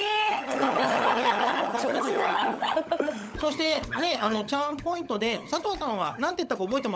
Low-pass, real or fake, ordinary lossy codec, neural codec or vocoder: none; fake; none; codec, 16 kHz, 16 kbps, FunCodec, trained on LibriTTS, 50 frames a second